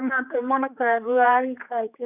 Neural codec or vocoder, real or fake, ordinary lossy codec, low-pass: codec, 16 kHz, 4 kbps, X-Codec, HuBERT features, trained on general audio; fake; none; 3.6 kHz